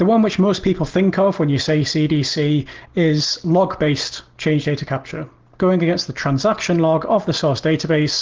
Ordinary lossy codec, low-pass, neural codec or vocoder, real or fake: Opus, 16 kbps; 7.2 kHz; none; real